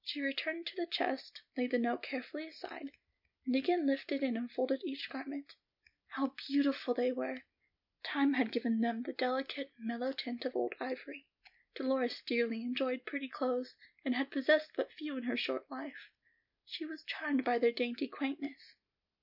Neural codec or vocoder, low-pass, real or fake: none; 5.4 kHz; real